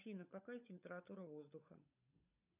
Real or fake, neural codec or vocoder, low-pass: fake; codec, 16 kHz, 16 kbps, FunCodec, trained on LibriTTS, 50 frames a second; 3.6 kHz